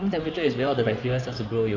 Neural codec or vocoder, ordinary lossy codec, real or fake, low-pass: codec, 16 kHz in and 24 kHz out, 2.2 kbps, FireRedTTS-2 codec; none; fake; 7.2 kHz